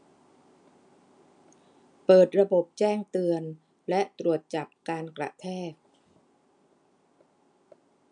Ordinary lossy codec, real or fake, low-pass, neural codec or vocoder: none; real; 9.9 kHz; none